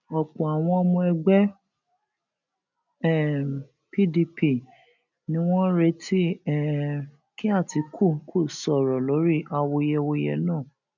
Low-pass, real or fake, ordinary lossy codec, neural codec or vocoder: 7.2 kHz; real; none; none